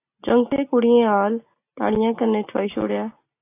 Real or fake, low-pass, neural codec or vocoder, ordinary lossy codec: real; 3.6 kHz; none; AAC, 24 kbps